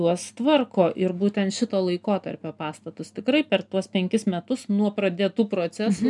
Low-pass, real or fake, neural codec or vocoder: 10.8 kHz; real; none